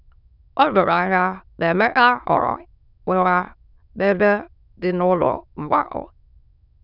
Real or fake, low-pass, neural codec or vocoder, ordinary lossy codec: fake; 5.4 kHz; autoencoder, 22.05 kHz, a latent of 192 numbers a frame, VITS, trained on many speakers; none